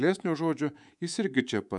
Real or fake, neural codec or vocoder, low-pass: fake; codec, 24 kHz, 3.1 kbps, DualCodec; 10.8 kHz